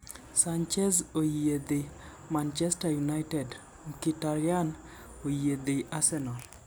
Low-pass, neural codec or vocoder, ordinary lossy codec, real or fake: none; none; none; real